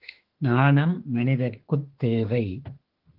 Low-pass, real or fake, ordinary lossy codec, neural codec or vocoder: 5.4 kHz; fake; Opus, 16 kbps; autoencoder, 48 kHz, 32 numbers a frame, DAC-VAE, trained on Japanese speech